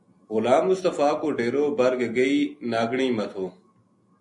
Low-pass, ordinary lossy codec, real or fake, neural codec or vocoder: 10.8 kHz; MP3, 48 kbps; real; none